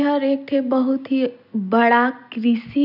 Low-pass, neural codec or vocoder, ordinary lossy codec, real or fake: 5.4 kHz; none; none; real